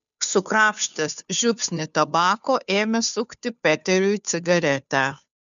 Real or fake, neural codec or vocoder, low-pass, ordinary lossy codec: fake; codec, 16 kHz, 2 kbps, FunCodec, trained on Chinese and English, 25 frames a second; 7.2 kHz; MP3, 96 kbps